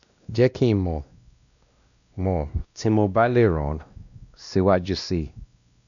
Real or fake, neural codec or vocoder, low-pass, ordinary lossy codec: fake; codec, 16 kHz, 1 kbps, X-Codec, WavLM features, trained on Multilingual LibriSpeech; 7.2 kHz; none